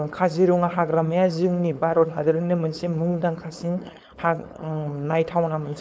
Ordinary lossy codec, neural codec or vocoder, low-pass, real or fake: none; codec, 16 kHz, 4.8 kbps, FACodec; none; fake